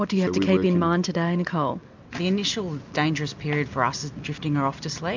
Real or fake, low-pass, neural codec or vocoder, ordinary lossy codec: real; 7.2 kHz; none; MP3, 64 kbps